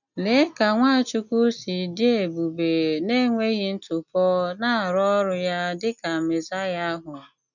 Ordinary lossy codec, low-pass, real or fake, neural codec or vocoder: none; 7.2 kHz; real; none